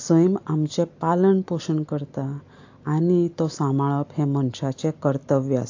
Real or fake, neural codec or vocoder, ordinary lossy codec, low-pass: real; none; AAC, 48 kbps; 7.2 kHz